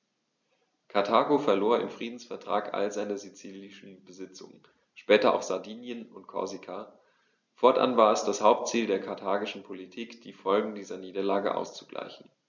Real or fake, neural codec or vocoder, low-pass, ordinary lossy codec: real; none; 7.2 kHz; none